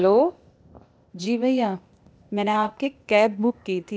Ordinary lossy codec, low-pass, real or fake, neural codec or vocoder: none; none; fake; codec, 16 kHz, 0.8 kbps, ZipCodec